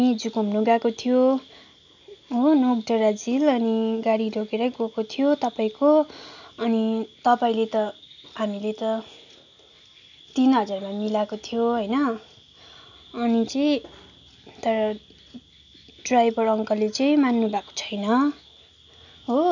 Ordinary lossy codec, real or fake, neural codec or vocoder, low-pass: none; real; none; 7.2 kHz